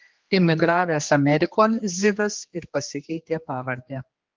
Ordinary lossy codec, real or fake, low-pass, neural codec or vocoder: Opus, 32 kbps; fake; 7.2 kHz; codec, 16 kHz, 2 kbps, X-Codec, HuBERT features, trained on general audio